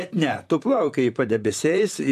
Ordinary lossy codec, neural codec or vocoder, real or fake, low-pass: AAC, 96 kbps; vocoder, 44.1 kHz, 128 mel bands, Pupu-Vocoder; fake; 14.4 kHz